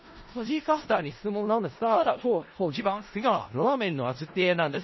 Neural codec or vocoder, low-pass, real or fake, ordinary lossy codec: codec, 16 kHz in and 24 kHz out, 0.4 kbps, LongCat-Audio-Codec, four codebook decoder; 7.2 kHz; fake; MP3, 24 kbps